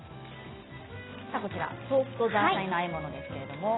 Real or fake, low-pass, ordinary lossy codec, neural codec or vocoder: real; 7.2 kHz; AAC, 16 kbps; none